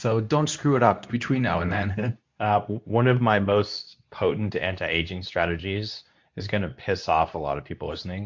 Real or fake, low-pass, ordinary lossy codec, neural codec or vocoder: fake; 7.2 kHz; MP3, 48 kbps; codec, 24 kHz, 0.9 kbps, WavTokenizer, medium speech release version 2